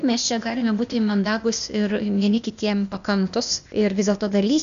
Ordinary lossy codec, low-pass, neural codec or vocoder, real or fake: MP3, 96 kbps; 7.2 kHz; codec, 16 kHz, 0.8 kbps, ZipCodec; fake